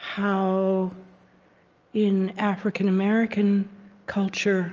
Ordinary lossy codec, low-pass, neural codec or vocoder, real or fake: Opus, 24 kbps; 7.2 kHz; none; real